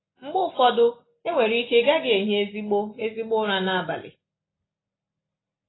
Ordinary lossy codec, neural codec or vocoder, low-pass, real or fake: AAC, 16 kbps; none; 7.2 kHz; real